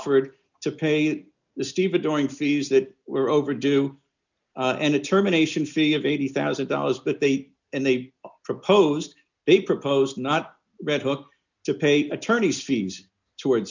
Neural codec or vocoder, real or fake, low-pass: none; real; 7.2 kHz